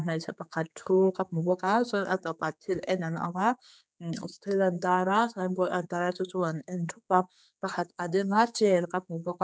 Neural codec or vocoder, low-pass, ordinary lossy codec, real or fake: codec, 16 kHz, 4 kbps, X-Codec, HuBERT features, trained on general audio; none; none; fake